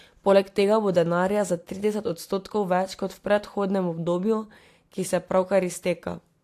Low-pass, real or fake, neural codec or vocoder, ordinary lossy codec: 14.4 kHz; real; none; AAC, 64 kbps